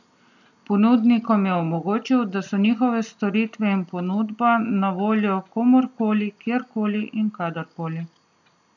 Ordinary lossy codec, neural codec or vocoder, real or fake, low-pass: none; none; real; 7.2 kHz